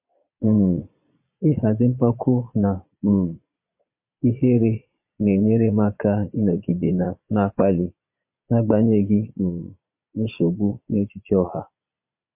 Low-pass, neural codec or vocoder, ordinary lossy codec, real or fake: 3.6 kHz; vocoder, 22.05 kHz, 80 mel bands, Vocos; MP3, 32 kbps; fake